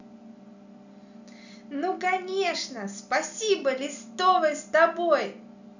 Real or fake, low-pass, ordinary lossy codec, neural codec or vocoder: real; 7.2 kHz; none; none